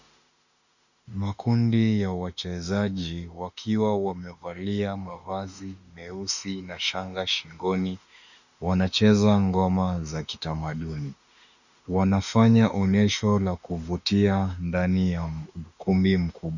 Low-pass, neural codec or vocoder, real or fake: 7.2 kHz; autoencoder, 48 kHz, 32 numbers a frame, DAC-VAE, trained on Japanese speech; fake